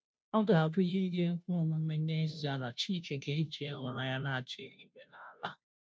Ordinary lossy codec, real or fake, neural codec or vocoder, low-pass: none; fake; codec, 16 kHz, 0.5 kbps, FunCodec, trained on Chinese and English, 25 frames a second; none